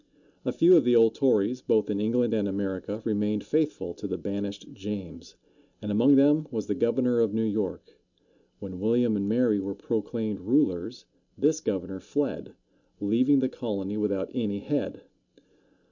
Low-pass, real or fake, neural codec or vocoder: 7.2 kHz; real; none